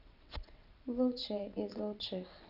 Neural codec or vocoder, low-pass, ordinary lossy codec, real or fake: vocoder, 22.05 kHz, 80 mel bands, WaveNeXt; 5.4 kHz; none; fake